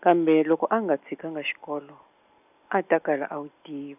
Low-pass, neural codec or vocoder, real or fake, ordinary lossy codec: 3.6 kHz; none; real; none